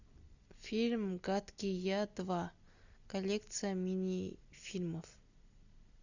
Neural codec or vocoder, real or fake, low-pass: none; real; 7.2 kHz